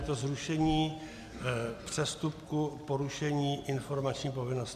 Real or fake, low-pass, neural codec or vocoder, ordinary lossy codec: real; 14.4 kHz; none; MP3, 96 kbps